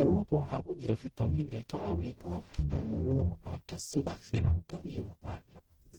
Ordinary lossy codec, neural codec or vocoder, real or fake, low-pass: Opus, 16 kbps; codec, 44.1 kHz, 0.9 kbps, DAC; fake; 19.8 kHz